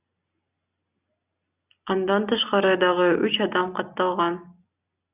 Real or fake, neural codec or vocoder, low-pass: real; none; 3.6 kHz